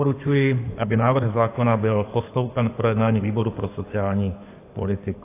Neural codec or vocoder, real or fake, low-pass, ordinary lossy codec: codec, 16 kHz in and 24 kHz out, 2.2 kbps, FireRedTTS-2 codec; fake; 3.6 kHz; AAC, 24 kbps